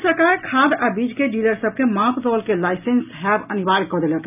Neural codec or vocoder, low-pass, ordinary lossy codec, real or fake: none; 3.6 kHz; none; real